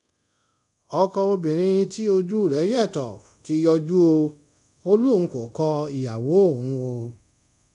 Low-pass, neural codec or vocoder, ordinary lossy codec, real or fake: 10.8 kHz; codec, 24 kHz, 0.5 kbps, DualCodec; none; fake